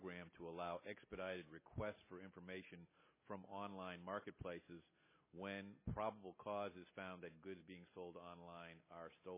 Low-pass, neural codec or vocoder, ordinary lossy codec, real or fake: 3.6 kHz; none; MP3, 16 kbps; real